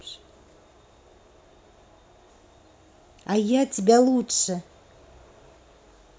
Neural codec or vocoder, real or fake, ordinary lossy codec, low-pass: none; real; none; none